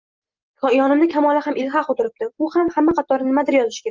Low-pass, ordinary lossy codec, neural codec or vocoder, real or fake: 7.2 kHz; Opus, 32 kbps; none; real